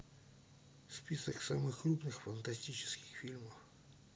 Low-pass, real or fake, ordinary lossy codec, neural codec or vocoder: none; real; none; none